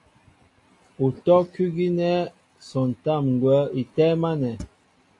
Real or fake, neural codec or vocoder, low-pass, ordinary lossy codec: real; none; 10.8 kHz; AAC, 48 kbps